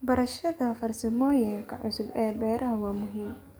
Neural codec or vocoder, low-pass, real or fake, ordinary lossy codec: codec, 44.1 kHz, 7.8 kbps, DAC; none; fake; none